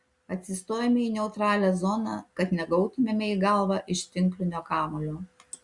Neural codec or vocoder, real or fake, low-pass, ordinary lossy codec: none; real; 10.8 kHz; Opus, 64 kbps